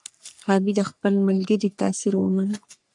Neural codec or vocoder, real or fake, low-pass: codec, 44.1 kHz, 3.4 kbps, Pupu-Codec; fake; 10.8 kHz